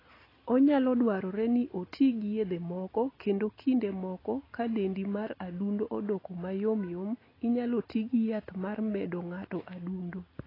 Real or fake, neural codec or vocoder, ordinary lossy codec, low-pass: real; none; AAC, 24 kbps; 5.4 kHz